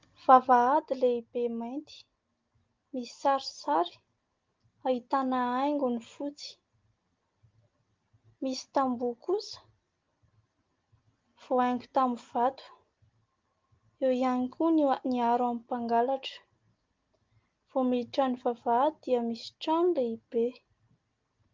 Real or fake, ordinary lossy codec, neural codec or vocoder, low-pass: real; Opus, 32 kbps; none; 7.2 kHz